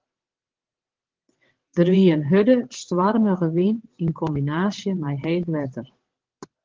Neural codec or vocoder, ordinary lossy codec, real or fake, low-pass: vocoder, 22.05 kHz, 80 mel bands, WaveNeXt; Opus, 16 kbps; fake; 7.2 kHz